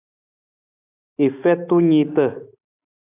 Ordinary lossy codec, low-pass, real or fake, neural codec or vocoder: AAC, 24 kbps; 3.6 kHz; real; none